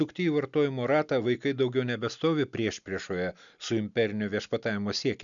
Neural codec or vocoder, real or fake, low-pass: none; real; 7.2 kHz